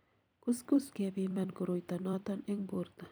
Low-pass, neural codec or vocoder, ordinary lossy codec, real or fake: none; vocoder, 44.1 kHz, 128 mel bands every 256 samples, BigVGAN v2; none; fake